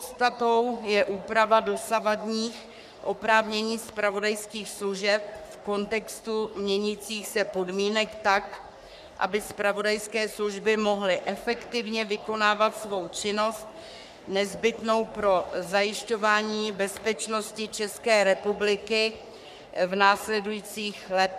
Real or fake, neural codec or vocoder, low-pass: fake; codec, 44.1 kHz, 3.4 kbps, Pupu-Codec; 14.4 kHz